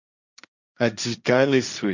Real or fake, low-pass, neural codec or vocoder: fake; 7.2 kHz; codec, 16 kHz, 1.1 kbps, Voila-Tokenizer